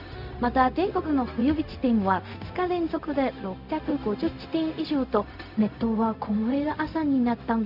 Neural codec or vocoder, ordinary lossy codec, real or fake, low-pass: codec, 16 kHz, 0.4 kbps, LongCat-Audio-Codec; none; fake; 5.4 kHz